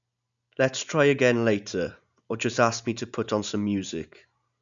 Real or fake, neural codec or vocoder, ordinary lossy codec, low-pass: real; none; none; 7.2 kHz